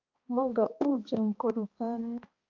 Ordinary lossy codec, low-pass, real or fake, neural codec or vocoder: Opus, 24 kbps; 7.2 kHz; fake; codec, 16 kHz, 1 kbps, X-Codec, HuBERT features, trained on balanced general audio